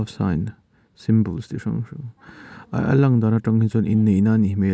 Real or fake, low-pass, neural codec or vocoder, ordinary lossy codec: real; none; none; none